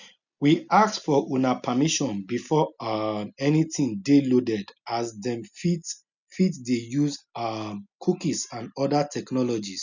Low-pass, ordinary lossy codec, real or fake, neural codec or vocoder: 7.2 kHz; none; real; none